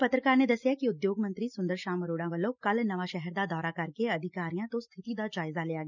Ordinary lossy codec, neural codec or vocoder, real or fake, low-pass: none; none; real; 7.2 kHz